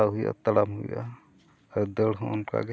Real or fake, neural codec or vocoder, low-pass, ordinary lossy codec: real; none; none; none